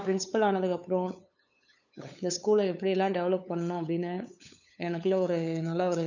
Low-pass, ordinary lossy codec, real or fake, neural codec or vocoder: 7.2 kHz; none; fake; codec, 16 kHz, 8 kbps, FunCodec, trained on LibriTTS, 25 frames a second